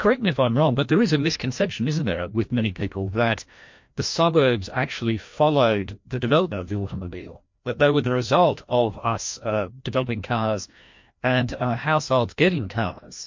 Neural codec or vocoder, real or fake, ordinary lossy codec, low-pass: codec, 16 kHz, 1 kbps, FreqCodec, larger model; fake; MP3, 48 kbps; 7.2 kHz